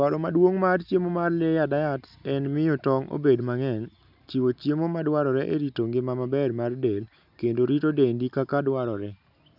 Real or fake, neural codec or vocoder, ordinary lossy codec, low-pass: real; none; none; 5.4 kHz